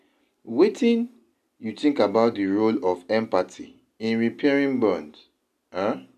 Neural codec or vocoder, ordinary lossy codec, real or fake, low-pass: none; none; real; 14.4 kHz